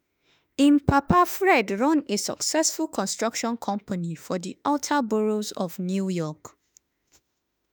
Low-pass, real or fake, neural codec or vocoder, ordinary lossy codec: none; fake; autoencoder, 48 kHz, 32 numbers a frame, DAC-VAE, trained on Japanese speech; none